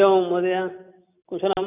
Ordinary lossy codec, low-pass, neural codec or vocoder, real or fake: none; 3.6 kHz; none; real